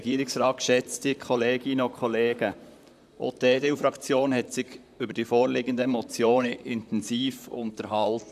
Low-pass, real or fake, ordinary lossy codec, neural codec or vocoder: 14.4 kHz; fake; none; vocoder, 44.1 kHz, 128 mel bands, Pupu-Vocoder